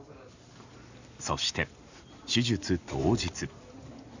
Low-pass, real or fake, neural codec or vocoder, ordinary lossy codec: 7.2 kHz; real; none; Opus, 64 kbps